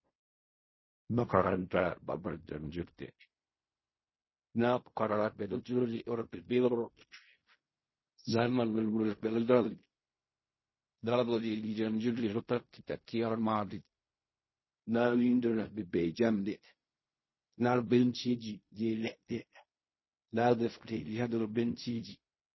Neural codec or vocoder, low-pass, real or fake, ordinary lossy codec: codec, 16 kHz in and 24 kHz out, 0.4 kbps, LongCat-Audio-Codec, fine tuned four codebook decoder; 7.2 kHz; fake; MP3, 24 kbps